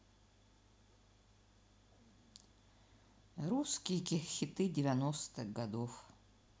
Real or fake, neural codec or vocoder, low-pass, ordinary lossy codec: real; none; none; none